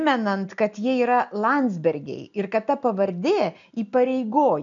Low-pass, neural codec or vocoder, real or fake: 7.2 kHz; none; real